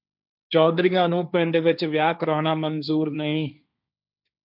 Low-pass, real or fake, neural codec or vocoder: 5.4 kHz; fake; codec, 16 kHz, 1.1 kbps, Voila-Tokenizer